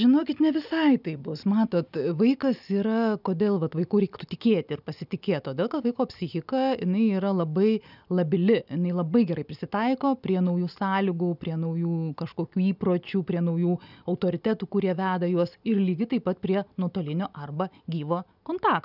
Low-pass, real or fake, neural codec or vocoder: 5.4 kHz; real; none